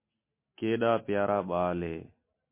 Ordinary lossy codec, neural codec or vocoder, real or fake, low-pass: MP3, 24 kbps; none; real; 3.6 kHz